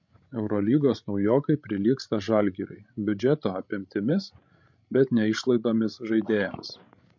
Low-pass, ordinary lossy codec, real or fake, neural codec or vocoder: 7.2 kHz; MP3, 48 kbps; fake; codec, 16 kHz, 16 kbps, FreqCodec, larger model